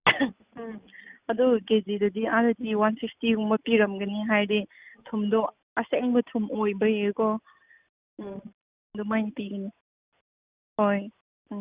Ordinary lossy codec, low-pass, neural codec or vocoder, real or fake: Opus, 64 kbps; 3.6 kHz; none; real